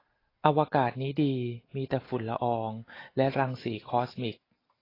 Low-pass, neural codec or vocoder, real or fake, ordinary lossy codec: 5.4 kHz; none; real; AAC, 24 kbps